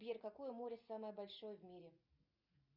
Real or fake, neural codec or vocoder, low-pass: real; none; 5.4 kHz